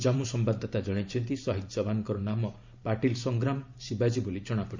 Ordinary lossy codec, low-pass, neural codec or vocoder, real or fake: MP3, 64 kbps; 7.2 kHz; vocoder, 44.1 kHz, 128 mel bands every 512 samples, BigVGAN v2; fake